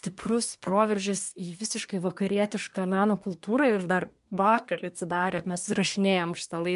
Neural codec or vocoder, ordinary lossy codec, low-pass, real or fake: codec, 24 kHz, 1 kbps, SNAC; MP3, 64 kbps; 10.8 kHz; fake